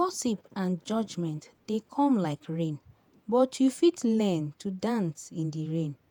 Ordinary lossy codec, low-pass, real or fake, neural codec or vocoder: none; none; fake; vocoder, 48 kHz, 128 mel bands, Vocos